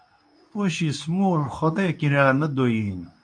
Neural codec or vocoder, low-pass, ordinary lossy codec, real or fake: codec, 24 kHz, 0.9 kbps, WavTokenizer, medium speech release version 2; 9.9 kHz; AAC, 64 kbps; fake